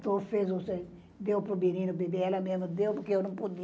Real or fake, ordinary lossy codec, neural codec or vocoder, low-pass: real; none; none; none